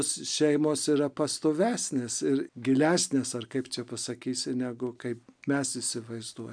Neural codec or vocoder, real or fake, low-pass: none; real; 9.9 kHz